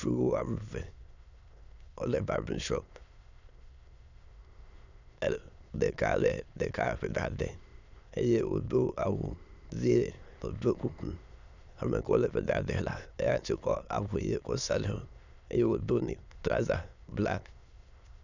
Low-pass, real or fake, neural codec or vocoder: 7.2 kHz; fake; autoencoder, 22.05 kHz, a latent of 192 numbers a frame, VITS, trained on many speakers